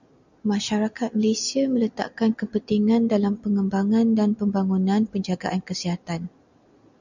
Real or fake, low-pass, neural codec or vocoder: real; 7.2 kHz; none